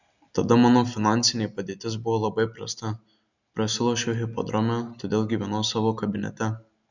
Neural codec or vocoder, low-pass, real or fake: none; 7.2 kHz; real